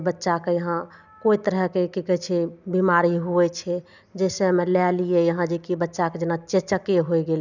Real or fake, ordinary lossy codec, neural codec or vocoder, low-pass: real; none; none; 7.2 kHz